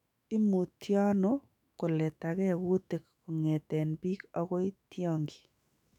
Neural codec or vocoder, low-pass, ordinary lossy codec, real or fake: autoencoder, 48 kHz, 128 numbers a frame, DAC-VAE, trained on Japanese speech; 19.8 kHz; none; fake